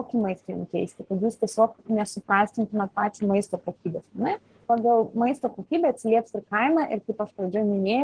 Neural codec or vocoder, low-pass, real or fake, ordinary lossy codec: codec, 44.1 kHz, 7.8 kbps, Pupu-Codec; 9.9 kHz; fake; Opus, 16 kbps